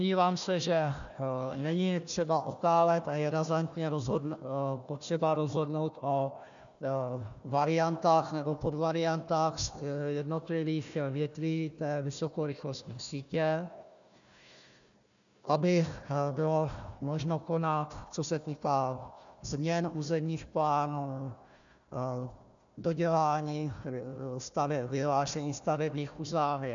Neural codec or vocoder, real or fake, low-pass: codec, 16 kHz, 1 kbps, FunCodec, trained on Chinese and English, 50 frames a second; fake; 7.2 kHz